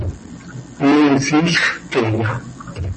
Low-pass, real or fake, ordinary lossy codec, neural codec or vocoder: 9.9 kHz; real; MP3, 32 kbps; none